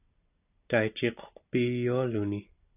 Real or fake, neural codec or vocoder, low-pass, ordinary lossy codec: real; none; 3.6 kHz; AAC, 32 kbps